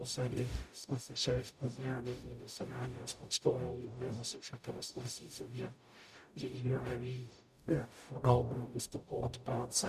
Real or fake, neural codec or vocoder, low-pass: fake; codec, 44.1 kHz, 0.9 kbps, DAC; 14.4 kHz